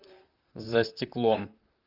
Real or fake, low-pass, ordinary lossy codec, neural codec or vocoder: fake; 5.4 kHz; Opus, 32 kbps; vocoder, 44.1 kHz, 128 mel bands, Pupu-Vocoder